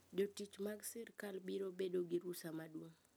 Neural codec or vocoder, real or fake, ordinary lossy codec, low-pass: none; real; none; none